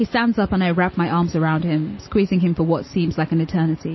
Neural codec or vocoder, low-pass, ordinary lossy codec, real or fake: none; 7.2 kHz; MP3, 24 kbps; real